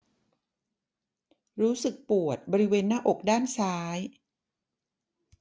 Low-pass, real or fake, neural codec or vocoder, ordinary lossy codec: none; real; none; none